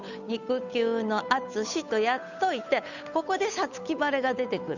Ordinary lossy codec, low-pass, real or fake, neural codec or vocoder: none; 7.2 kHz; fake; codec, 16 kHz, 8 kbps, FunCodec, trained on Chinese and English, 25 frames a second